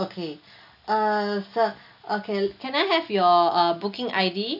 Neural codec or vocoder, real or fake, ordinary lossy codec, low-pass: none; real; AAC, 48 kbps; 5.4 kHz